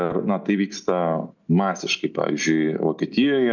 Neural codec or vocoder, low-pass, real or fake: none; 7.2 kHz; real